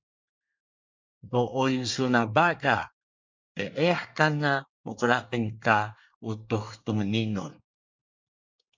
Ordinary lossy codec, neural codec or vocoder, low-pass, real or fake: MP3, 64 kbps; codec, 24 kHz, 1 kbps, SNAC; 7.2 kHz; fake